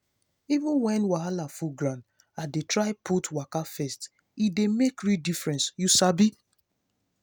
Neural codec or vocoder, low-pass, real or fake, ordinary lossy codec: none; none; real; none